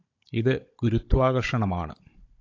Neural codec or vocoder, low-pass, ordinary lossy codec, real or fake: codec, 16 kHz, 16 kbps, FunCodec, trained on Chinese and English, 50 frames a second; 7.2 kHz; AAC, 48 kbps; fake